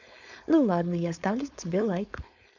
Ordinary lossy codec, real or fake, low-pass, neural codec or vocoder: none; fake; 7.2 kHz; codec, 16 kHz, 4.8 kbps, FACodec